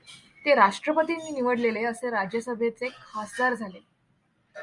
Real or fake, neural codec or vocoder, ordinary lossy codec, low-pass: real; none; Opus, 64 kbps; 10.8 kHz